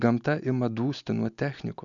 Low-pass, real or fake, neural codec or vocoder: 7.2 kHz; real; none